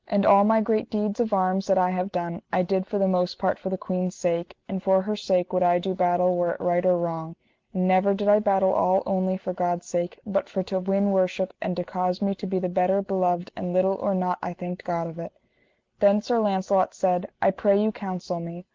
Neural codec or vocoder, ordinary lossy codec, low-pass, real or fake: none; Opus, 16 kbps; 7.2 kHz; real